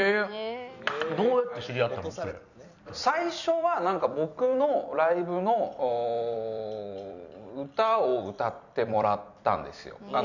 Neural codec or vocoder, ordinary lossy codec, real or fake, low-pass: vocoder, 44.1 kHz, 128 mel bands every 256 samples, BigVGAN v2; none; fake; 7.2 kHz